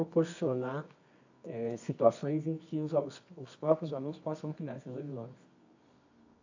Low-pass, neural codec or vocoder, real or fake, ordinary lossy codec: 7.2 kHz; codec, 24 kHz, 0.9 kbps, WavTokenizer, medium music audio release; fake; AAC, 48 kbps